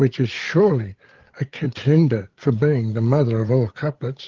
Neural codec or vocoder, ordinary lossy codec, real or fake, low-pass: codec, 16 kHz, 8 kbps, FreqCodec, larger model; Opus, 16 kbps; fake; 7.2 kHz